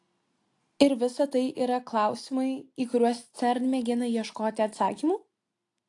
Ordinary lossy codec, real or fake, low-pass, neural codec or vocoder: AAC, 48 kbps; real; 10.8 kHz; none